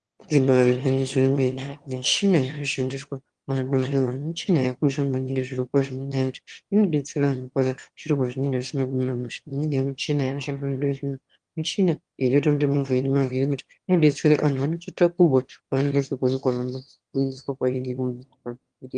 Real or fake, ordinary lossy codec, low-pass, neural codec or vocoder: fake; Opus, 24 kbps; 9.9 kHz; autoencoder, 22.05 kHz, a latent of 192 numbers a frame, VITS, trained on one speaker